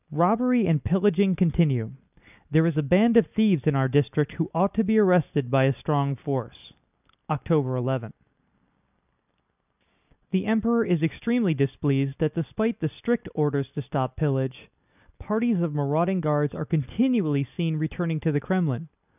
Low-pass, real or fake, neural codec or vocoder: 3.6 kHz; real; none